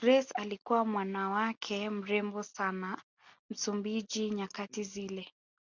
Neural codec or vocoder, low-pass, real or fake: none; 7.2 kHz; real